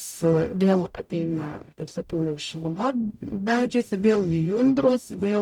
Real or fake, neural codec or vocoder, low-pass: fake; codec, 44.1 kHz, 0.9 kbps, DAC; 19.8 kHz